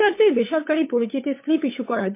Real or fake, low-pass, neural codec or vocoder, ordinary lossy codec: fake; 3.6 kHz; codec, 16 kHz, 8 kbps, FunCodec, trained on LibriTTS, 25 frames a second; MP3, 24 kbps